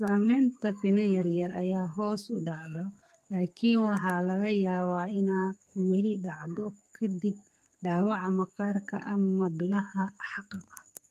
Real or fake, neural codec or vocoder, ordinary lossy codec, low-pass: fake; codec, 32 kHz, 1.9 kbps, SNAC; Opus, 32 kbps; 14.4 kHz